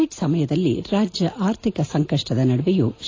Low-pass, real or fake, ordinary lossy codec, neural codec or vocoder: 7.2 kHz; real; AAC, 32 kbps; none